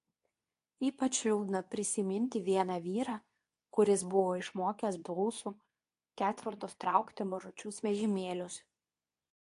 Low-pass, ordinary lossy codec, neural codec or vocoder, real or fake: 10.8 kHz; AAC, 64 kbps; codec, 24 kHz, 0.9 kbps, WavTokenizer, medium speech release version 2; fake